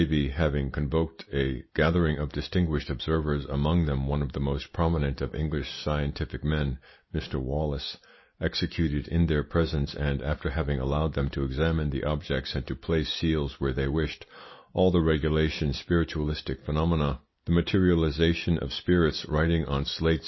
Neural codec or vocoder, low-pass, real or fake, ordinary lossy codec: none; 7.2 kHz; real; MP3, 24 kbps